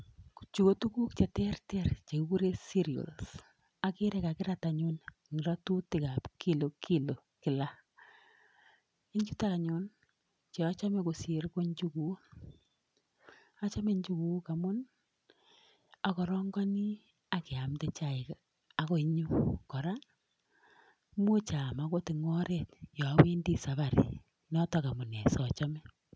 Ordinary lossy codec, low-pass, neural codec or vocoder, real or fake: none; none; none; real